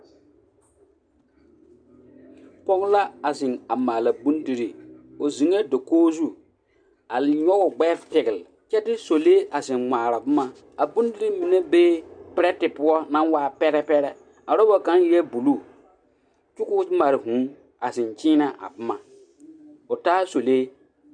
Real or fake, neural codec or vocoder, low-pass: real; none; 9.9 kHz